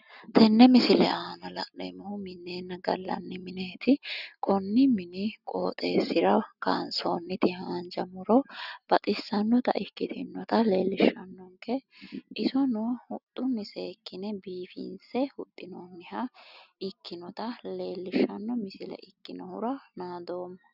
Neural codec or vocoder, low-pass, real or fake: none; 5.4 kHz; real